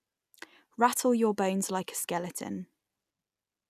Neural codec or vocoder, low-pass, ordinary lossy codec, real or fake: none; 14.4 kHz; none; real